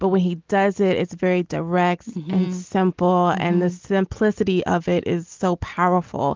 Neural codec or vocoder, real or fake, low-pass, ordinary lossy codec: none; real; 7.2 kHz; Opus, 32 kbps